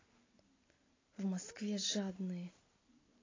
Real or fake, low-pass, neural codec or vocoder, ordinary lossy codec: real; 7.2 kHz; none; AAC, 32 kbps